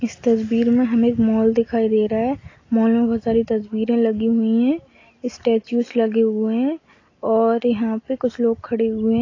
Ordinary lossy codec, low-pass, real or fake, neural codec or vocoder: AAC, 32 kbps; 7.2 kHz; real; none